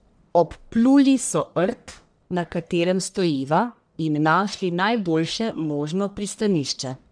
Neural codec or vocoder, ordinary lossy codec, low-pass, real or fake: codec, 44.1 kHz, 1.7 kbps, Pupu-Codec; none; 9.9 kHz; fake